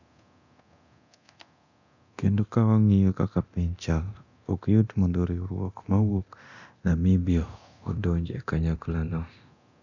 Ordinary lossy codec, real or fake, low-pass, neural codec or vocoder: none; fake; 7.2 kHz; codec, 24 kHz, 0.9 kbps, DualCodec